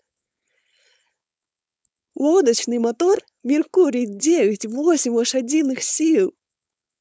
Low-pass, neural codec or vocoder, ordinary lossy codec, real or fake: none; codec, 16 kHz, 4.8 kbps, FACodec; none; fake